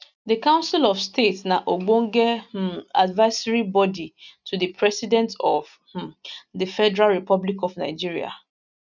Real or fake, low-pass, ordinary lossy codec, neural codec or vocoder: real; 7.2 kHz; none; none